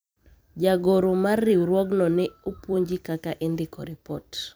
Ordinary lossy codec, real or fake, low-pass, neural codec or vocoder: none; fake; none; vocoder, 44.1 kHz, 128 mel bands every 256 samples, BigVGAN v2